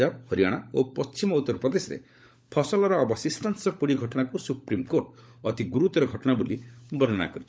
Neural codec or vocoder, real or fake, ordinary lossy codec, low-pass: codec, 16 kHz, 16 kbps, FunCodec, trained on LibriTTS, 50 frames a second; fake; none; none